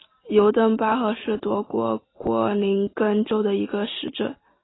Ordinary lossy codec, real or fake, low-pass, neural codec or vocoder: AAC, 16 kbps; real; 7.2 kHz; none